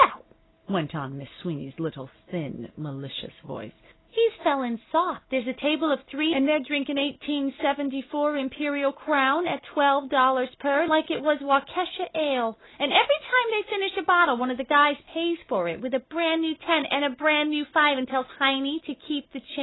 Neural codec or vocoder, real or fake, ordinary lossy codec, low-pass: none; real; AAC, 16 kbps; 7.2 kHz